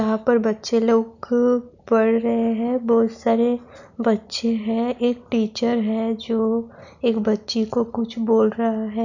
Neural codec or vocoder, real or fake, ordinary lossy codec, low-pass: none; real; none; 7.2 kHz